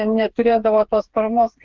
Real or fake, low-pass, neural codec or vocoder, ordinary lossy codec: fake; 7.2 kHz; codec, 16 kHz, 4 kbps, FreqCodec, smaller model; Opus, 16 kbps